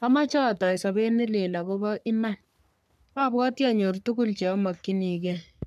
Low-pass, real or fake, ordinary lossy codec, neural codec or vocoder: 14.4 kHz; fake; none; codec, 44.1 kHz, 3.4 kbps, Pupu-Codec